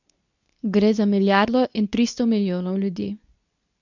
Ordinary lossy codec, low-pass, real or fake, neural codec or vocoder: none; 7.2 kHz; fake; codec, 24 kHz, 0.9 kbps, WavTokenizer, medium speech release version 2